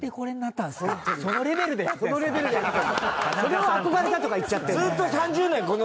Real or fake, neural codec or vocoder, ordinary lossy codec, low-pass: real; none; none; none